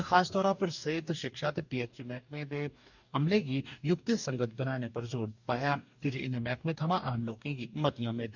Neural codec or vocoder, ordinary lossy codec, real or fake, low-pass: codec, 44.1 kHz, 2.6 kbps, DAC; none; fake; 7.2 kHz